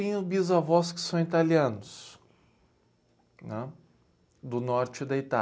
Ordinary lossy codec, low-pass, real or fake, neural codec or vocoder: none; none; real; none